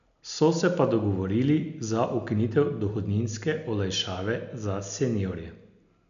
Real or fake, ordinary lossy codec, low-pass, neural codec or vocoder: real; none; 7.2 kHz; none